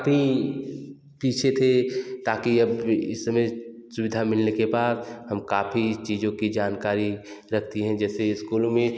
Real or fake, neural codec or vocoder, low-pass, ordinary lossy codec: real; none; none; none